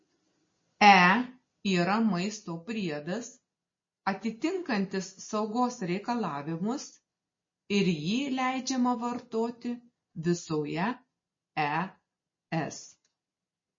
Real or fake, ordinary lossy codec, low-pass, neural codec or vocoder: real; MP3, 32 kbps; 7.2 kHz; none